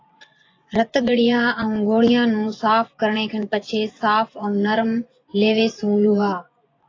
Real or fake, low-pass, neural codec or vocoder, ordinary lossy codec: fake; 7.2 kHz; vocoder, 24 kHz, 100 mel bands, Vocos; AAC, 32 kbps